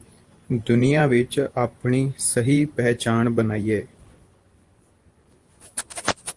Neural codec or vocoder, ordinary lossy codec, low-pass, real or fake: vocoder, 24 kHz, 100 mel bands, Vocos; Opus, 24 kbps; 10.8 kHz; fake